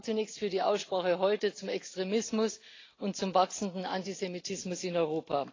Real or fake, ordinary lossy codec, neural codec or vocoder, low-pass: real; AAC, 32 kbps; none; 7.2 kHz